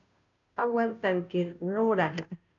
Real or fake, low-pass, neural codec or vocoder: fake; 7.2 kHz; codec, 16 kHz, 0.5 kbps, FunCodec, trained on Chinese and English, 25 frames a second